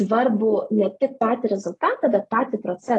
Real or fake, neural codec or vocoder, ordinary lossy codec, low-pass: real; none; AAC, 32 kbps; 10.8 kHz